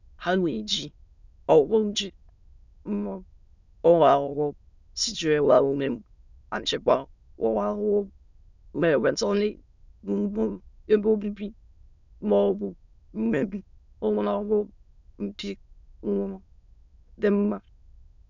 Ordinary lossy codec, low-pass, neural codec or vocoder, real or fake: none; 7.2 kHz; autoencoder, 22.05 kHz, a latent of 192 numbers a frame, VITS, trained on many speakers; fake